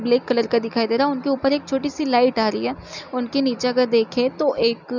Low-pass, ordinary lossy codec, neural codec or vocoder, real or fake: 7.2 kHz; none; none; real